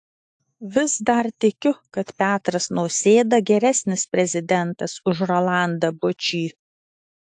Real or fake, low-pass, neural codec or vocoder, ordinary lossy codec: fake; 10.8 kHz; autoencoder, 48 kHz, 128 numbers a frame, DAC-VAE, trained on Japanese speech; AAC, 64 kbps